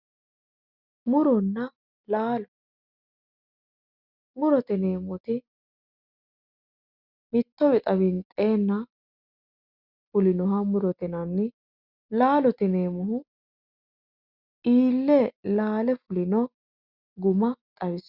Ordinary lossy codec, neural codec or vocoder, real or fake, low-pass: Opus, 64 kbps; none; real; 5.4 kHz